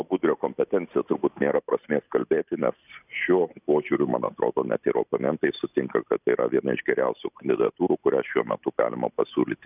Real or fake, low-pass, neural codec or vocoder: real; 3.6 kHz; none